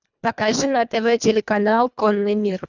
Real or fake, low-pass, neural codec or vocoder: fake; 7.2 kHz; codec, 24 kHz, 1.5 kbps, HILCodec